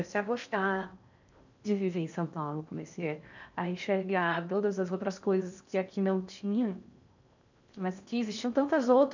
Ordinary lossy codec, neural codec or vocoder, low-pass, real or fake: none; codec, 16 kHz in and 24 kHz out, 0.6 kbps, FocalCodec, streaming, 4096 codes; 7.2 kHz; fake